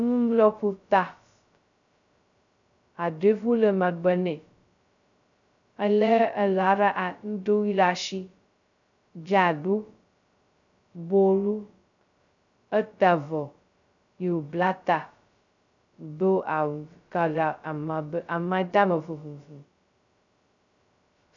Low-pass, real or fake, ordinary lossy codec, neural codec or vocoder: 7.2 kHz; fake; MP3, 96 kbps; codec, 16 kHz, 0.2 kbps, FocalCodec